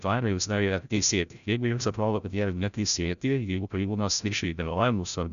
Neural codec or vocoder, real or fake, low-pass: codec, 16 kHz, 0.5 kbps, FreqCodec, larger model; fake; 7.2 kHz